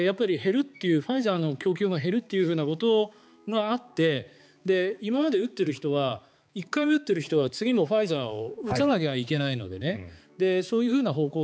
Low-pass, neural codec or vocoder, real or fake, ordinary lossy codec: none; codec, 16 kHz, 4 kbps, X-Codec, HuBERT features, trained on balanced general audio; fake; none